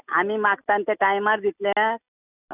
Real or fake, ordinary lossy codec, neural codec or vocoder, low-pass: real; none; none; 3.6 kHz